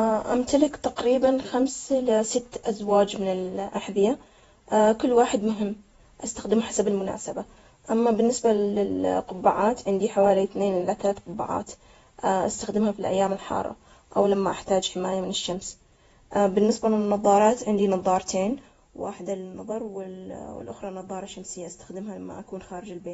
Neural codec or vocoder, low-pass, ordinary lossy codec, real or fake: vocoder, 48 kHz, 128 mel bands, Vocos; 19.8 kHz; AAC, 24 kbps; fake